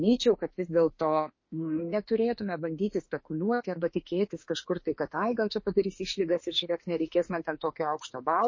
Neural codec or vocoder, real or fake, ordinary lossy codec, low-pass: autoencoder, 48 kHz, 32 numbers a frame, DAC-VAE, trained on Japanese speech; fake; MP3, 32 kbps; 7.2 kHz